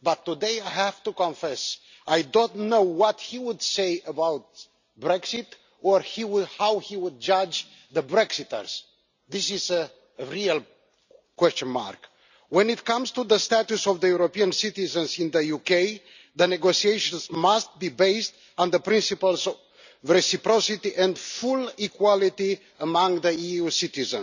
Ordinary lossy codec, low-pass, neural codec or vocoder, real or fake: none; 7.2 kHz; none; real